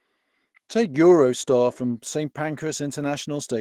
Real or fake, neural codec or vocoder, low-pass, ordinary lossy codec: fake; autoencoder, 48 kHz, 128 numbers a frame, DAC-VAE, trained on Japanese speech; 19.8 kHz; Opus, 16 kbps